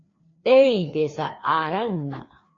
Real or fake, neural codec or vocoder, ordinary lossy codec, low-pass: fake; codec, 16 kHz, 2 kbps, FreqCodec, larger model; AAC, 32 kbps; 7.2 kHz